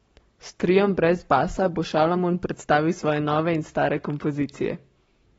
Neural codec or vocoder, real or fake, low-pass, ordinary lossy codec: codec, 44.1 kHz, 7.8 kbps, Pupu-Codec; fake; 19.8 kHz; AAC, 24 kbps